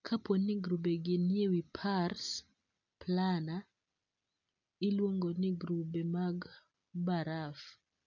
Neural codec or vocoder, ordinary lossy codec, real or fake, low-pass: none; none; real; 7.2 kHz